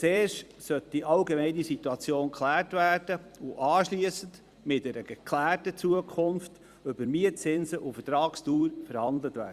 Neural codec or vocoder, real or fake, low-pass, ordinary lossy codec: vocoder, 44.1 kHz, 128 mel bands every 256 samples, BigVGAN v2; fake; 14.4 kHz; Opus, 64 kbps